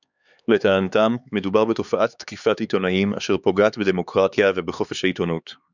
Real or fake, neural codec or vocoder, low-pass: fake; codec, 16 kHz, 4 kbps, X-Codec, HuBERT features, trained on LibriSpeech; 7.2 kHz